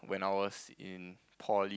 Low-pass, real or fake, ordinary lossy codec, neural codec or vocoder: none; real; none; none